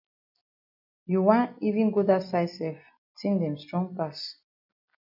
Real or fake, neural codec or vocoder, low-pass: real; none; 5.4 kHz